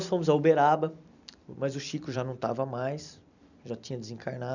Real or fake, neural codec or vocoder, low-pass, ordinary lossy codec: fake; vocoder, 44.1 kHz, 128 mel bands every 256 samples, BigVGAN v2; 7.2 kHz; none